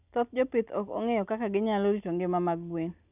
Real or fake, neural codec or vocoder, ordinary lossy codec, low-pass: real; none; none; 3.6 kHz